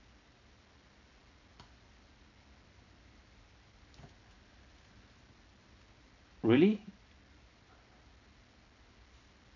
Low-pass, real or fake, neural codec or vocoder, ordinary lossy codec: 7.2 kHz; real; none; none